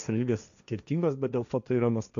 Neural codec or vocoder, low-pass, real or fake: codec, 16 kHz, 1.1 kbps, Voila-Tokenizer; 7.2 kHz; fake